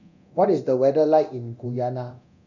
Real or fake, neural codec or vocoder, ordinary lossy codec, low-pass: fake; codec, 24 kHz, 0.9 kbps, DualCodec; none; 7.2 kHz